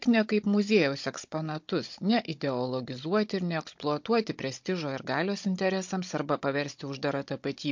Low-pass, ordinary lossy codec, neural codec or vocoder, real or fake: 7.2 kHz; MP3, 48 kbps; codec, 16 kHz, 16 kbps, FunCodec, trained on Chinese and English, 50 frames a second; fake